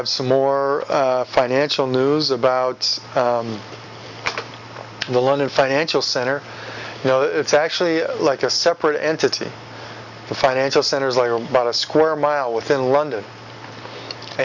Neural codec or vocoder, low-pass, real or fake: none; 7.2 kHz; real